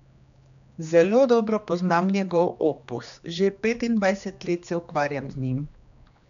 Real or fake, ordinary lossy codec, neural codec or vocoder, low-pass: fake; none; codec, 16 kHz, 2 kbps, X-Codec, HuBERT features, trained on general audio; 7.2 kHz